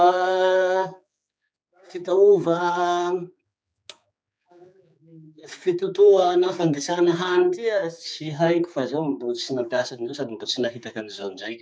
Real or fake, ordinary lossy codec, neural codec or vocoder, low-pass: fake; none; codec, 16 kHz, 4 kbps, X-Codec, HuBERT features, trained on general audio; none